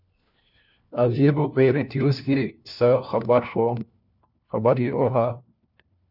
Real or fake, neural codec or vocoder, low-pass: fake; codec, 16 kHz, 1 kbps, FunCodec, trained on LibriTTS, 50 frames a second; 5.4 kHz